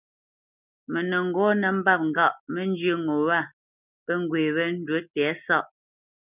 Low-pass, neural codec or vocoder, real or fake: 3.6 kHz; none; real